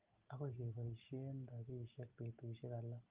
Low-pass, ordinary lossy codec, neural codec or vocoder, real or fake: 3.6 kHz; Opus, 24 kbps; none; real